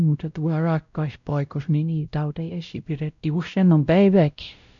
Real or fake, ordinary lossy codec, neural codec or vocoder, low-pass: fake; none; codec, 16 kHz, 0.5 kbps, X-Codec, WavLM features, trained on Multilingual LibriSpeech; 7.2 kHz